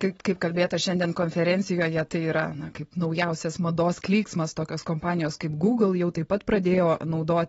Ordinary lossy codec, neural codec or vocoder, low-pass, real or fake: AAC, 24 kbps; none; 19.8 kHz; real